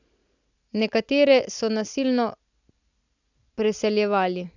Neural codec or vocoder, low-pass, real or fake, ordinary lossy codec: none; 7.2 kHz; real; none